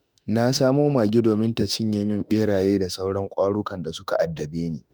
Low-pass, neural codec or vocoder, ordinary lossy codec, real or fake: none; autoencoder, 48 kHz, 32 numbers a frame, DAC-VAE, trained on Japanese speech; none; fake